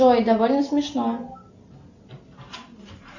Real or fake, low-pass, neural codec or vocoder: real; 7.2 kHz; none